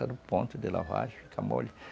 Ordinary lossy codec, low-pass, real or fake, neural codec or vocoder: none; none; real; none